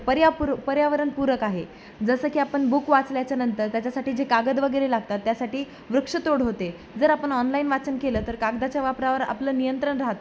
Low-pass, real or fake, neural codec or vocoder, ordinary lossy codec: none; real; none; none